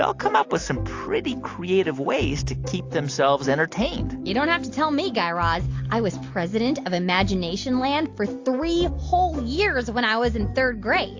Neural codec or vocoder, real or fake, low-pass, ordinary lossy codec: none; real; 7.2 kHz; AAC, 48 kbps